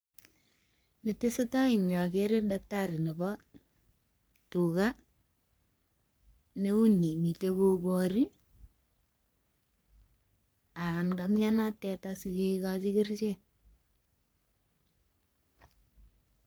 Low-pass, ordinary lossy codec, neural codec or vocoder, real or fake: none; none; codec, 44.1 kHz, 3.4 kbps, Pupu-Codec; fake